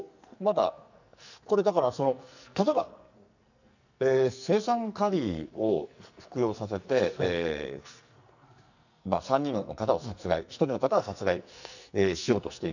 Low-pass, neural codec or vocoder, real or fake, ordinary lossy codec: 7.2 kHz; codec, 44.1 kHz, 2.6 kbps, SNAC; fake; none